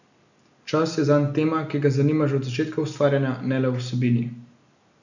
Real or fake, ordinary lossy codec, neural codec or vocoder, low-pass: real; none; none; 7.2 kHz